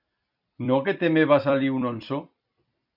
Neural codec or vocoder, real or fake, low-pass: vocoder, 44.1 kHz, 128 mel bands every 256 samples, BigVGAN v2; fake; 5.4 kHz